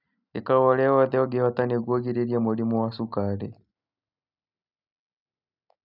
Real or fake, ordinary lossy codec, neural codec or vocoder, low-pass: real; none; none; 5.4 kHz